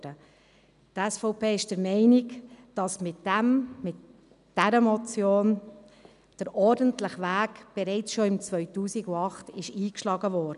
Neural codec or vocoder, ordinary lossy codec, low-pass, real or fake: none; none; 10.8 kHz; real